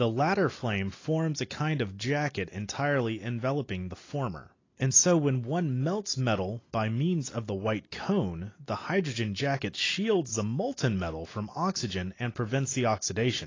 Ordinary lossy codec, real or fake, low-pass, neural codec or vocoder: AAC, 32 kbps; real; 7.2 kHz; none